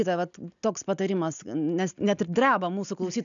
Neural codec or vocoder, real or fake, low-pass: none; real; 7.2 kHz